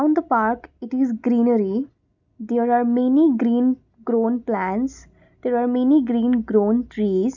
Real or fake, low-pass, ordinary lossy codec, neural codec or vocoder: real; 7.2 kHz; none; none